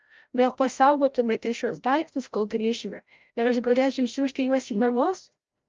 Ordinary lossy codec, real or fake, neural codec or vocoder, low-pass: Opus, 24 kbps; fake; codec, 16 kHz, 0.5 kbps, FreqCodec, larger model; 7.2 kHz